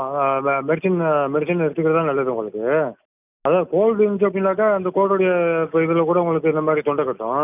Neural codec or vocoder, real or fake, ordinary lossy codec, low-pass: none; real; none; 3.6 kHz